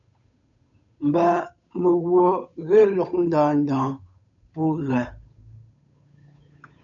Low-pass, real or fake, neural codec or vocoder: 7.2 kHz; fake; codec, 16 kHz, 8 kbps, FunCodec, trained on Chinese and English, 25 frames a second